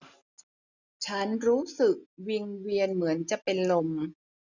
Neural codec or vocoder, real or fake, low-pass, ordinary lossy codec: none; real; 7.2 kHz; none